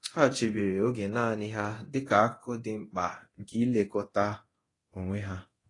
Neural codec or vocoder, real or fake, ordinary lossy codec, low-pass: codec, 24 kHz, 0.9 kbps, DualCodec; fake; AAC, 32 kbps; 10.8 kHz